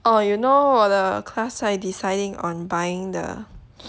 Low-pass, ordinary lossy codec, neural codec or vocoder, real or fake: none; none; none; real